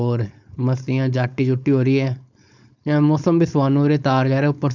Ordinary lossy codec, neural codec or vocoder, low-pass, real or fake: none; codec, 16 kHz, 4.8 kbps, FACodec; 7.2 kHz; fake